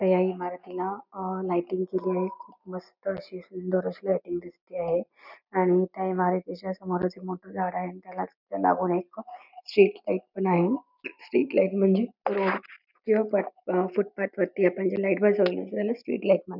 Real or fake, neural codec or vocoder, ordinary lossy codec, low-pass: real; none; none; 5.4 kHz